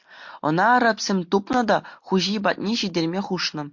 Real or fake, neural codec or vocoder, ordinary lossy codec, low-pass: real; none; MP3, 48 kbps; 7.2 kHz